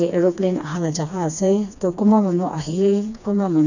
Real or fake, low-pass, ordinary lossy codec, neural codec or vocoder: fake; 7.2 kHz; none; codec, 16 kHz, 2 kbps, FreqCodec, smaller model